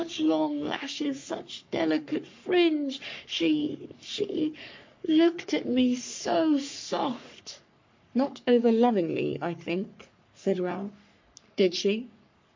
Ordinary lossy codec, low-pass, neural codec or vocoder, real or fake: MP3, 48 kbps; 7.2 kHz; codec, 44.1 kHz, 3.4 kbps, Pupu-Codec; fake